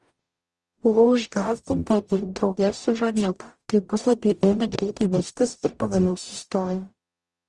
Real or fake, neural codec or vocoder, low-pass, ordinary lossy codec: fake; codec, 44.1 kHz, 0.9 kbps, DAC; 10.8 kHz; Opus, 32 kbps